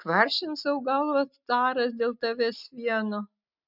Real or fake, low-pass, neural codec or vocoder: real; 5.4 kHz; none